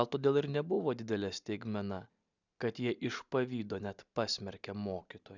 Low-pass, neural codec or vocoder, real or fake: 7.2 kHz; none; real